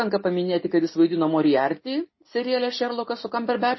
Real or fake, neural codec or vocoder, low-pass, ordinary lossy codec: real; none; 7.2 kHz; MP3, 24 kbps